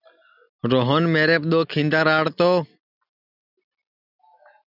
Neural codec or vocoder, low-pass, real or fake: none; 5.4 kHz; real